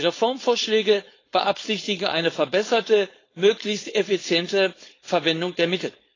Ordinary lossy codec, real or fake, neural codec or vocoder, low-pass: AAC, 32 kbps; fake; codec, 16 kHz, 4.8 kbps, FACodec; 7.2 kHz